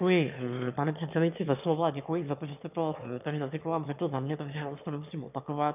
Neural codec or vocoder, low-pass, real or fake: autoencoder, 22.05 kHz, a latent of 192 numbers a frame, VITS, trained on one speaker; 3.6 kHz; fake